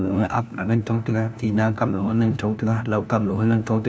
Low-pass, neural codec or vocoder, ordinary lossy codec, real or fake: none; codec, 16 kHz, 1 kbps, FunCodec, trained on LibriTTS, 50 frames a second; none; fake